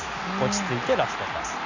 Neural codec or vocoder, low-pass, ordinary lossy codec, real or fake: none; 7.2 kHz; none; real